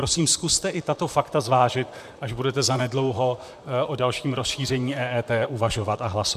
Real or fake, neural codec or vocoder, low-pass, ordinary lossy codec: fake; vocoder, 44.1 kHz, 128 mel bands, Pupu-Vocoder; 14.4 kHz; MP3, 96 kbps